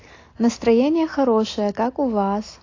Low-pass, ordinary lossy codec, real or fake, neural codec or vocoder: 7.2 kHz; AAC, 32 kbps; real; none